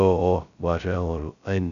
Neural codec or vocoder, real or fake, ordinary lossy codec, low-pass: codec, 16 kHz, 0.2 kbps, FocalCodec; fake; none; 7.2 kHz